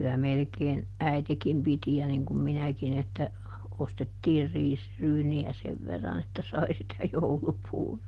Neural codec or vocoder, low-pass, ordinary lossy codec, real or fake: none; 14.4 kHz; Opus, 16 kbps; real